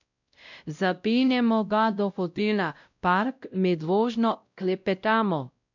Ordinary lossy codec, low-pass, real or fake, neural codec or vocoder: none; 7.2 kHz; fake; codec, 16 kHz, 0.5 kbps, X-Codec, WavLM features, trained on Multilingual LibriSpeech